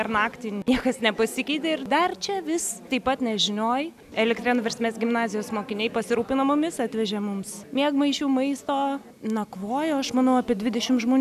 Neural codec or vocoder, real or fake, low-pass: none; real; 14.4 kHz